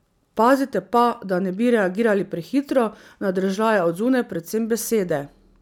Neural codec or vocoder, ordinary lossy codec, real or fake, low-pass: none; none; real; 19.8 kHz